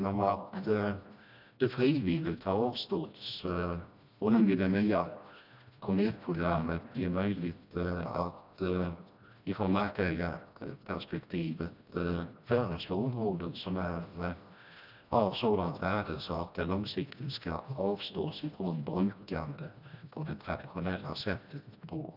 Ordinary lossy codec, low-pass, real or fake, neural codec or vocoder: none; 5.4 kHz; fake; codec, 16 kHz, 1 kbps, FreqCodec, smaller model